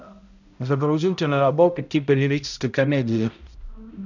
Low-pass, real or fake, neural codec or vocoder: 7.2 kHz; fake; codec, 16 kHz, 0.5 kbps, X-Codec, HuBERT features, trained on balanced general audio